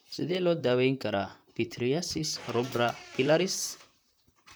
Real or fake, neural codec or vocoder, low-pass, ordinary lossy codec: fake; vocoder, 44.1 kHz, 128 mel bands, Pupu-Vocoder; none; none